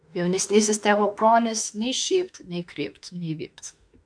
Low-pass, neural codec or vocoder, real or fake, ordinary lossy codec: 9.9 kHz; autoencoder, 48 kHz, 32 numbers a frame, DAC-VAE, trained on Japanese speech; fake; MP3, 64 kbps